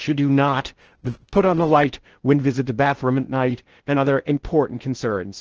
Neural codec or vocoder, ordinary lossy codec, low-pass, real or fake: codec, 16 kHz in and 24 kHz out, 0.6 kbps, FocalCodec, streaming, 4096 codes; Opus, 16 kbps; 7.2 kHz; fake